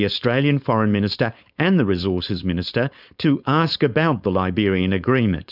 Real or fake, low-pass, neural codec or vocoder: fake; 5.4 kHz; codec, 16 kHz, 4.8 kbps, FACodec